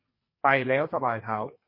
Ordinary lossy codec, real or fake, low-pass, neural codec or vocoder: MP3, 24 kbps; fake; 5.4 kHz; codec, 44.1 kHz, 2.6 kbps, SNAC